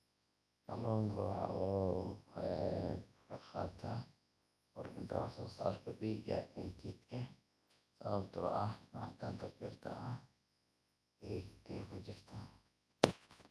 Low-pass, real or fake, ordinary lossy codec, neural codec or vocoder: none; fake; none; codec, 24 kHz, 0.9 kbps, WavTokenizer, large speech release